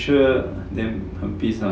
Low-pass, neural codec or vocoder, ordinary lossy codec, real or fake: none; none; none; real